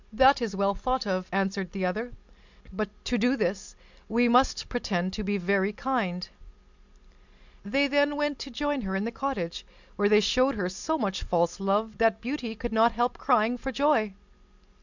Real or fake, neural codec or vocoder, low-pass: real; none; 7.2 kHz